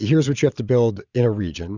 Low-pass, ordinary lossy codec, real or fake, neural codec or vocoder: 7.2 kHz; Opus, 64 kbps; fake; vocoder, 22.05 kHz, 80 mel bands, Vocos